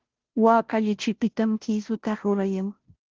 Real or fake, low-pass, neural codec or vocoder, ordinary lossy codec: fake; 7.2 kHz; codec, 16 kHz, 0.5 kbps, FunCodec, trained on Chinese and English, 25 frames a second; Opus, 16 kbps